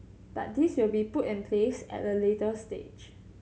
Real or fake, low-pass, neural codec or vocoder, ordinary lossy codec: real; none; none; none